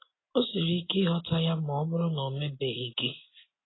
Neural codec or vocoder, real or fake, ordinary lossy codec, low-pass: none; real; AAC, 16 kbps; 7.2 kHz